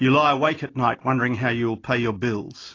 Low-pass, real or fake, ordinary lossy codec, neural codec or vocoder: 7.2 kHz; real; AAC, 32 kbps; none